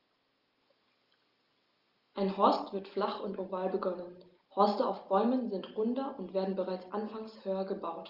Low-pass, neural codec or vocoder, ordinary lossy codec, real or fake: 5.4 kHz; none; Opus, 32 kbps; real